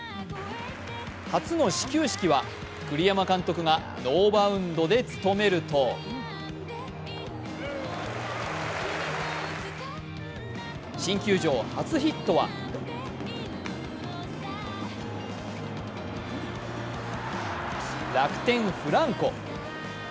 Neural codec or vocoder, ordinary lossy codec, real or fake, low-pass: none; none; real; none